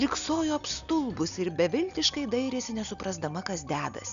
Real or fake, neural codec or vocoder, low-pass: real; none; 7.2 kHz